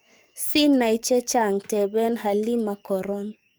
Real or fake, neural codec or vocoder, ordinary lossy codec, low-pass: fake; codec, 44.1 kHz, 7.8 kbps, DAC; none; none